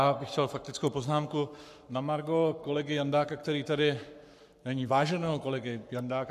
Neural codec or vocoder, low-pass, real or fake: codec, 44.1 kHz, 7.8 kbps, Pupu-Codec; 14.4 kHz; fake